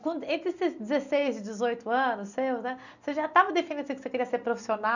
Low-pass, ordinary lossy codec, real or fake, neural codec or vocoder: 7.2 kHz; none; real; none